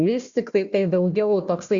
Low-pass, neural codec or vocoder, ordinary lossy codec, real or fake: 7.2 kHz; codec, 16 kHz, 1 kbps, FunCodec, trained on Chinese and English, 50 frames a second; Opus, 64 kbps; fake